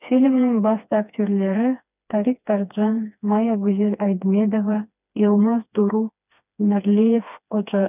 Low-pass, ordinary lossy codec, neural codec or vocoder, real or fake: 3.6 kHz; none; codec, 16 kHz, 2 kbps, FreqCodec, smaller model; fake